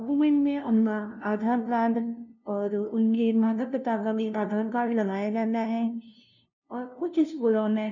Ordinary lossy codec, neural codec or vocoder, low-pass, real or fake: none; codec, 16 kHz, 0.5 kbps, FunCodec, trained on LibriTTS, 25 frames a second; 7.2 kHz; fake